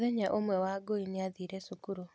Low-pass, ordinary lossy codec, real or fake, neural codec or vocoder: none; none; real; none